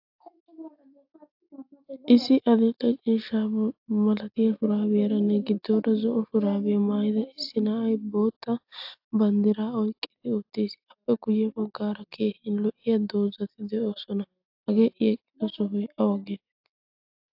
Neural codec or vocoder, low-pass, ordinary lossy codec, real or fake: none; 5.4 kHz; AAC, 48 kbps; real